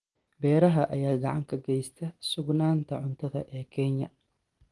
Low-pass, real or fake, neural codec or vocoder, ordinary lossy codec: 10.8 kHz; real; none; Opus, 24 kbps